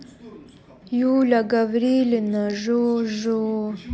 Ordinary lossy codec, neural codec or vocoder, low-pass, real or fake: none; none; none; real